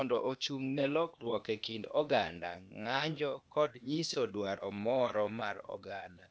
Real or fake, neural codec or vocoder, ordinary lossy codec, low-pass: fake; codec, 16 kHz, 0.8 kbps, ZipCodec; none; none